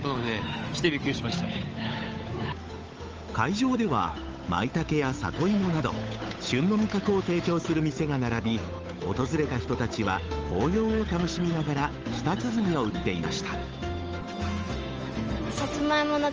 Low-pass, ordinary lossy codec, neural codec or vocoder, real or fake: 7.2 kHz; Opus, 24 kbps; codec, 16 kHz, 8 kbps, FunCodec, trained on Chinese and English, 25 frames a second; fake